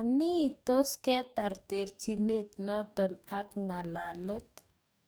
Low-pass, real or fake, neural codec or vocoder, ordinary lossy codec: none; fake; codec, 44.1 kHz, 2.6 kbps, DAC; none